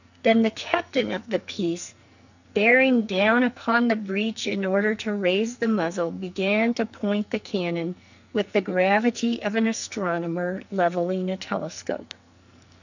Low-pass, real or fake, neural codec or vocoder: 7.2 kHz; fake; codec, 44.1 kHz, 2.6 kbps, SNAC